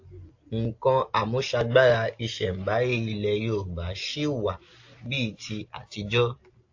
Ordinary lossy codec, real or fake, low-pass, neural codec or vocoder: AAC, 48 kbps; real; 7.2 kHz; none